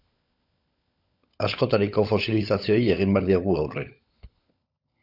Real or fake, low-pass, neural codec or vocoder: fake; 5.4 kHz; codec, 16 kHz, 16 kbps, FunCodec, trained on LibriTTS, 50 frames a second